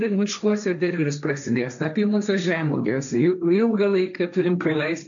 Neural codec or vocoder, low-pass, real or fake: codec, 16 kHz, 1.1 kbps, Voila-Tokenizer; 7.2 kHz; fake